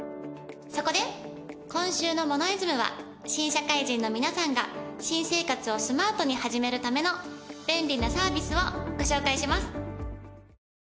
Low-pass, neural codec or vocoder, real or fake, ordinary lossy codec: none; none; real; none